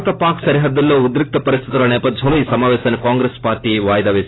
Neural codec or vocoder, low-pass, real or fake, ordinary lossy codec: none; 7.2 kHz; real; AAC, 16 kbps